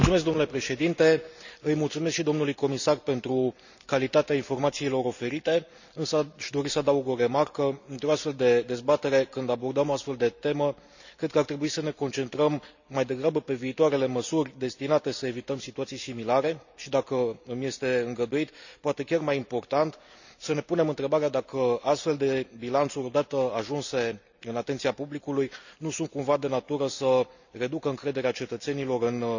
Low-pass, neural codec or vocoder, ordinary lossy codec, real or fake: 7.2 kHz; none; none; real